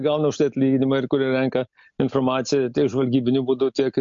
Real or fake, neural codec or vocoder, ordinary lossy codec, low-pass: real; none; MP3, 48 kbps; 7.2 kHz